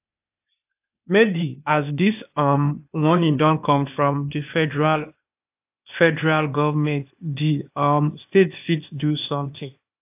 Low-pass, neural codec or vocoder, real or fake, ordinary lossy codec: 3.6 kHz; codec, 16 kHz, 0.8 kbps, ZipCodec; fake; AAC, 32 kbps